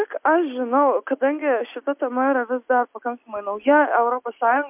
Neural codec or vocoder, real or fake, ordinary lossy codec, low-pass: none; real; MP3, 32 kbps; 3.6 kHz